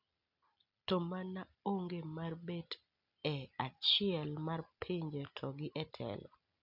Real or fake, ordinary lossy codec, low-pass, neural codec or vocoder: real; none; 5.4 kHz; none